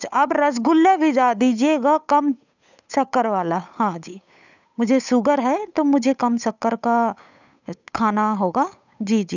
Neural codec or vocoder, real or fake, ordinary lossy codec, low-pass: codec, 16 kHz, 8 kbps, FunCodec, trained on Chinese and English, 25 frames a second; fake; none; 7.2 kHz